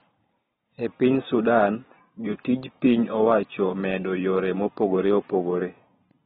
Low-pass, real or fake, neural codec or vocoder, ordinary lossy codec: 19.8 kHz; fake; vocoder, 44.1 kHz, 128 mel bands every 512 samples, BigVGAN v2; AAC, 16 kbps